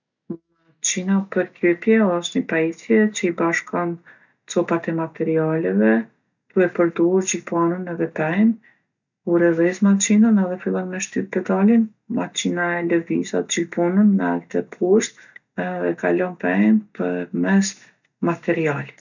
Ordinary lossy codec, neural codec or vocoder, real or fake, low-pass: none; none; real; 7.2 kHz